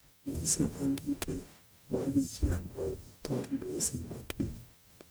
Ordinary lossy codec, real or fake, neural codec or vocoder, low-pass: none; fake; codec, 44.1 kHz, 0.9 kbps, DAC; none